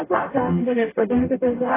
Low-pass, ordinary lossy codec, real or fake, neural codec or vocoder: 3.6 kHz; AAC, 16 kbps; fake; codec, 44.1 kHz, 0.9 kbps, DAC